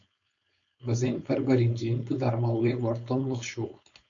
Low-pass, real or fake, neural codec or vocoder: 7.2 kHz; fake; codec, 16 kHz, 4.8 kbps, FACodec